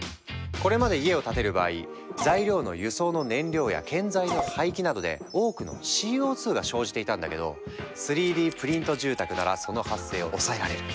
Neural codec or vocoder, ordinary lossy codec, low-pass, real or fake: none; none; none; real